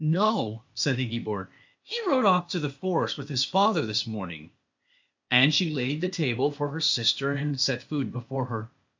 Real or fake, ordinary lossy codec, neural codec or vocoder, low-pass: fake; MP3, 48 kbps; codec, 16 kHz, 0.8 kbps, ZipCodec; 7.2 kHz